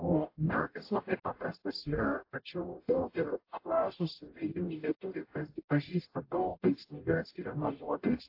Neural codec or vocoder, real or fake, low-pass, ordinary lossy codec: codec, 44.1 kHz, 0.9 kbps, DAC; fake; 5.4 kHz; AAC, 32 kbps